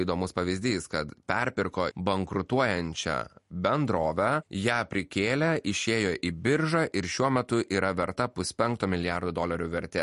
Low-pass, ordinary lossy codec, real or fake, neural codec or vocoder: 14.4 kHz; MP3, 48 kbps; real; none